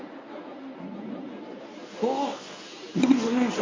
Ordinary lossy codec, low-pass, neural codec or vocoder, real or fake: MP3, 32 kbps; 7.2 kHz; codec, 24 kHz, 0.9 kbps, WavTokenizer, medium speech release version 1; fake